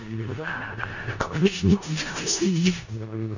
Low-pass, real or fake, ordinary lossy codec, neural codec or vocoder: 7.2 kHz; fake; none; codec, 16 kHz in and 24 kHz out, 0.4 kbps, LongCat-Audio-Codec, four codebook decoder